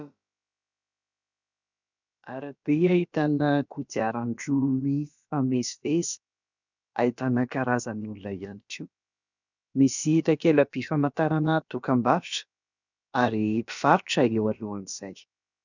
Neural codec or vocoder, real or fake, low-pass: codec, 16 kHz, about 1 kbps, DyCAST, with the encoder's durations; fake; 7.2 kHz